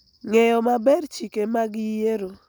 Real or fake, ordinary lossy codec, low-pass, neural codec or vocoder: real; none; none; none